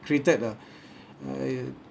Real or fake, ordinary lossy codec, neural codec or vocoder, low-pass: real; none; none; none